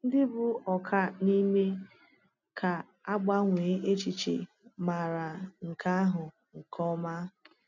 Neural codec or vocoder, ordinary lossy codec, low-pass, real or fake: none; none; 7.2 kHz; real